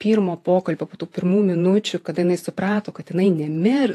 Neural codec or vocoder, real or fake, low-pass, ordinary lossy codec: vocoder, 48 kHz, 128 mel bands, Vocos; fake; 14.4 kHz; AAC, 64 kbps